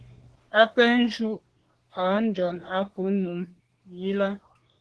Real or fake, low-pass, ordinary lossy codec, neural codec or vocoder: fake; 10.8 kHz; Opus, 16 kbps; codec, 24 kHz, 1 kbps, SNAC